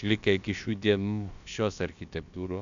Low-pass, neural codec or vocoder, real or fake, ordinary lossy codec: 7.2 kHz; codec, 16 kHz, about 1 kbps, DyCAST, with the encoder's durations; fake; AAC, 96 kbps